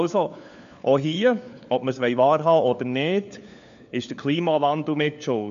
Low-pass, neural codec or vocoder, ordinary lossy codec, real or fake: 7.2 kHz; codec, 16 kHz, 4 kbps, FunCodec, trained on LibriTTS, 50 frames a second; MP3, 64 kbps; fake